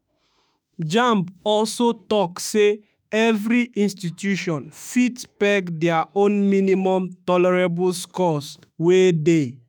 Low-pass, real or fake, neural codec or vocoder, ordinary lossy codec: none; fake; autoencoder, 48 kHz, 32 numbers a frame, DAC-VAE, trained on Japanese speech; none